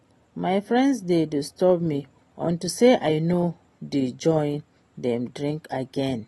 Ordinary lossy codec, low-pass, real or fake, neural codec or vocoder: AAC, 32 kbps; 19.8 kHz; real; none